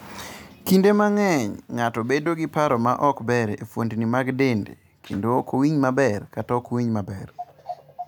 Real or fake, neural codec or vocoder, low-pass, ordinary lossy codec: real; none; none; none